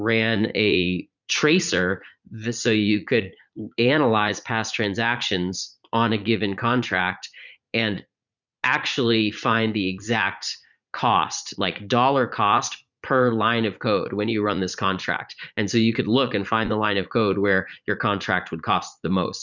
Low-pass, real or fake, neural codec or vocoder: 7.2 kHz; fake; vocoder, 44.1 kHz, 80 mel bands, Vocos